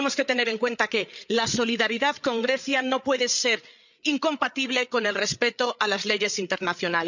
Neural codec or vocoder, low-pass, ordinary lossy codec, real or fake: codec, 16 kHz, 8 kbps, FreqCodec, larger model; 7.2 kHz; none; fake